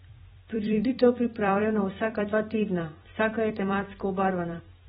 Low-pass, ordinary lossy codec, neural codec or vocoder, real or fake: 19.8 kHz; AAC, 16 kbps; vocoder, 44.1 kHz, 128 mel bands every 256 samples, BigVGAN v2; fake